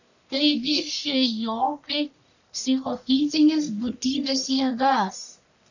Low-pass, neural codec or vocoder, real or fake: 7.2 kHz; codec, 44.1 kHz, 1.7 kbps, Pupu-Codec; fake